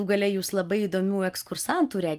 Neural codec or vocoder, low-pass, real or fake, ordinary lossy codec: none; 14.4 kHz; real; Opus, 32 kbps